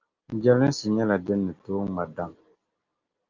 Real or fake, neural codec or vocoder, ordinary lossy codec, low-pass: real; none; Opus, 32 kbps; 7.2 kHz